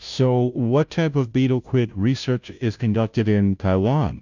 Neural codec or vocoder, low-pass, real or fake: codec, 16 kHz, 0.5 kbps, FunCodec, trained on Chinese and English, 25 frames a second; 7.2 kHz; fake